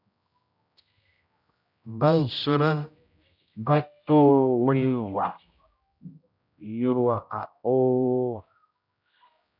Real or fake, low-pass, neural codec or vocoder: fake; 5.4 kHz; codec, 16 kHz, 0.5 kbps, X-Codec, HuBERT features, trained on general audio